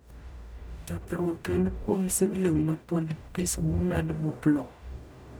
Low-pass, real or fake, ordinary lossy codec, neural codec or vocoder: none; fake; none; codec, 44.1 kHz, 0.9 kbps, DAC